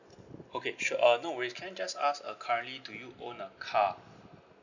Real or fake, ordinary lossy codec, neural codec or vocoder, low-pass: real; none; none; 7.2 kHz